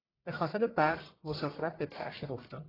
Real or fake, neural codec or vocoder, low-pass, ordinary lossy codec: fake; codec, 44.1 kHz, 1.7 kbps, Pupu-Codec; 5.4 kHz; AAC, 24 kbps